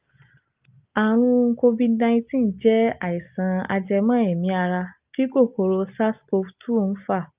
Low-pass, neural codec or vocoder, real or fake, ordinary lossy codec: 3.6 kHz; none; real; Opus, 24 kbps